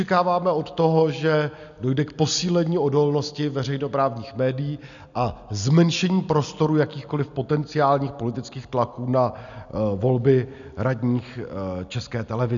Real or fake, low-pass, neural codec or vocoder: real; 7.2 kHz; none